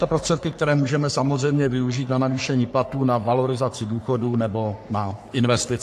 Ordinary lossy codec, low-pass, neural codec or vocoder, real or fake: AAC, 64 kbps; 14.4 kHz; codec, 44.1 kHz, 3.4 kbps, Pupu-Codec; fake